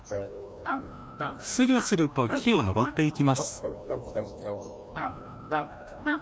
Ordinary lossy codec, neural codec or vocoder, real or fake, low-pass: none; codec, 16 kHz, 1 kbps, FreqCodec, larger model; fake; none